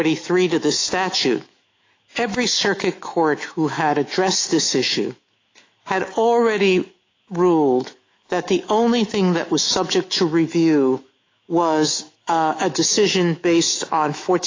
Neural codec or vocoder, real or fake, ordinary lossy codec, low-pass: autoencoder, 48 kHz, 128 numbers a frame, DAC-VAE, trained on Japanese speech; fake; AAC, 32 kbps; 7.2 kHz